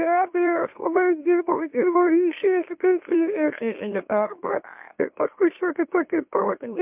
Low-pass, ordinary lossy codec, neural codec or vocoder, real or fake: 3.6 kHz; MP3, 32 kbps; autoencoder, 44.1 kHz, a latent of 192 numbers a frame, MeloTTS; fake